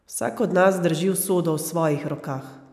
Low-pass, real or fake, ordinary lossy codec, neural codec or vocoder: 14.4 kHz; real; none; none